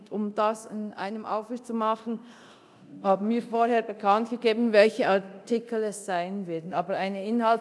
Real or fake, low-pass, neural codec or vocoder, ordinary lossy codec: fake; none; codec, 24 kHz, 0.9 kbps, DualCodec; none